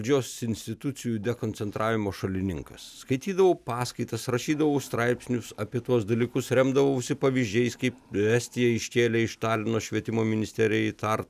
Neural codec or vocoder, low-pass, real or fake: none; 14.4 kHz; real